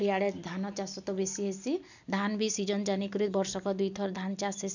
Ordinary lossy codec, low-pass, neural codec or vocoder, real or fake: none; 7.2 kHz; none; real